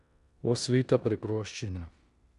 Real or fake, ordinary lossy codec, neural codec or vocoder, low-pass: fake; none; codec, 16 kHz in and 24 kHz out, 0.9 kbps, LongCat-Audio-Codec, four codebook decoder; 10.8 kHz